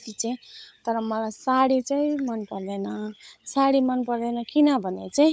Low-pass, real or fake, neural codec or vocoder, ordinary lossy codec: none; fake; codec, 16 kHz, 16 kbps, FunCodec, trained on LibriTTS, 50 frames a second; none